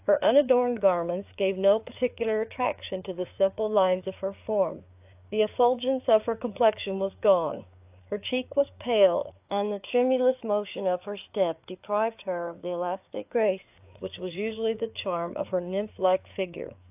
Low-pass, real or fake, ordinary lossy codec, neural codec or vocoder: 3.6 kHz; fake; AAC, 32 kbps; codec, 16 kHz, 4 kbps, FreqCodec, larger model